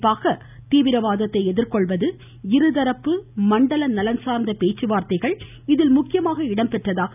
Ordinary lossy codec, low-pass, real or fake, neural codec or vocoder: none; 3.6 kHz; real; none